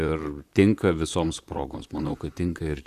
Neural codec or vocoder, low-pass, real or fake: vocoder, 44.1 kHz, 128 mel bands, Pupu-Vocoder; 14.4 kHz; fake